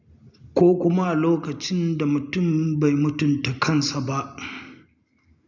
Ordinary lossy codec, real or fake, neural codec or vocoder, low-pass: none; real; none; 7.2 kHz